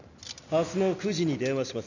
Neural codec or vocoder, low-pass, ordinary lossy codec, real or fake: none; 7.2 kHz; none; real